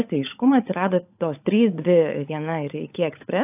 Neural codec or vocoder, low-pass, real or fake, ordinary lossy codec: codec, 16 kHz, 16 kbps, FunCodec, trained on LibriTTS, 50 frames a second; 3.6 kHz; fake; AAC, 32 kbps